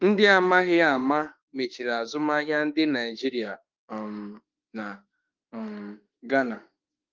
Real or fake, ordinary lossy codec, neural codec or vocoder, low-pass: fake; Opus, 32 kbps; autoencoder, 48 kHz, 32 numbers a frame, DAC-VAE, trained on Japanese speech; 7.2 kHz